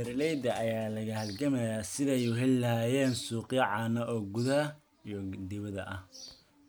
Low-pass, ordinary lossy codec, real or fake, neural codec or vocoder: none; none; real; none